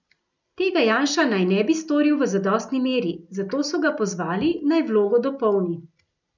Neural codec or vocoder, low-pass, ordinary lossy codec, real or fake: none; 7.2 kHz; none; real